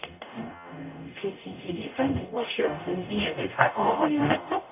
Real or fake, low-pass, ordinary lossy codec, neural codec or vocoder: fake; 3.6 kHz; none; codec, 44.1 kHz, 0.9 kbps, DAC